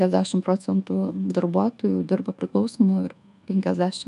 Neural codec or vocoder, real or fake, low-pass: codec, 24 kHz, 1.2 kbps, DualCodec; fake; 10.8 kHz